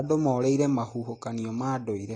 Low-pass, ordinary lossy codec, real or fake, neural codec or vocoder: 9.9 kHz; AAC, 32 kbps; real; none